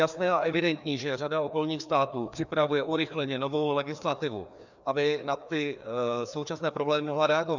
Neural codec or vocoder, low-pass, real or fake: codec, 16 kHz, 2 kbps, FreqCodec, larger model; 7.2 kHz; fake